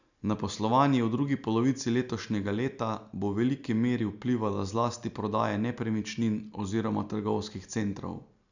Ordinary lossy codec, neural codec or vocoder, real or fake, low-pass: none; none; real; 7.2 kHz